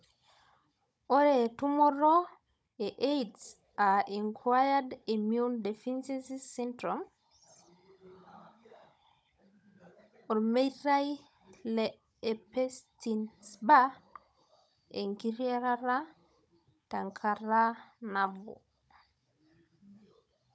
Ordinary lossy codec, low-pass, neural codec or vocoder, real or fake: none; none; codec, 16 kHz, 16 kbps, FunCodec, trained on Chinese and English, 50 frames a second; fake